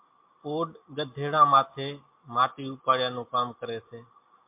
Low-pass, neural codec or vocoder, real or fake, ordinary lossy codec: 3.6 kHz; none; real; MP3, 32 kbps